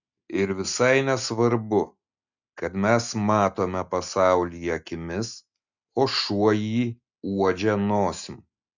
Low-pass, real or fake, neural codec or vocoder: 7.2 kHz; real; none